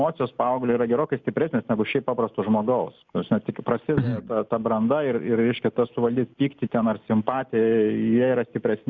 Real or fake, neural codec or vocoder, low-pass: real; none; 7.2 kHz